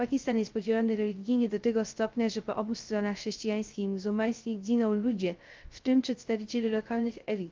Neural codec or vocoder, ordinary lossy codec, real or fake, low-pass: codec, 16 kHz, 0.3 kbps, FocalCodec; Opus, 32 kbps; fake; 7.2 kHz